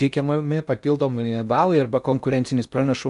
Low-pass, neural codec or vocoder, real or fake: 10.8 kHz; codec, 16 kHz in and 24 kHz out, 0.8 kbps, FocalCodec, streaming, 65536 codes; fake